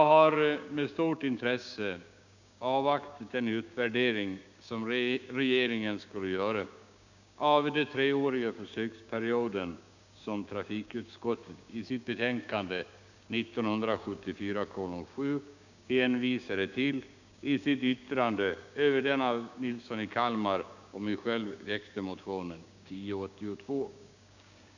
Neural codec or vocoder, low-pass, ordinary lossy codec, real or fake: codec, 16 kHz, 6 kbps, DAC; 7.2 kHz; none; fake